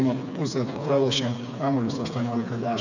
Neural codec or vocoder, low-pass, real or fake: codec, 16 kHz, 4 kbps, FreqCodec, smaller model; 7.2 kHz; fake